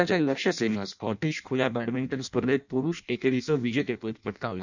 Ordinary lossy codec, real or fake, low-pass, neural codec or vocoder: none; fake; 7.2 kHz; codec, 16 kHz in and 24 kHz out, 0.6 kbps, FireRedTTS-2 codec